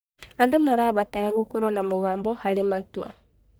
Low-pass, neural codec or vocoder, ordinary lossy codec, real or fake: none; codec, 44.1 kHz, 1.7 kbps, Pupu-Codec; none; fake